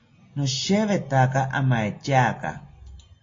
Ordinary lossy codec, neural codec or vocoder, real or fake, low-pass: AAC, 32 kbps; none; real; 7.2 kHz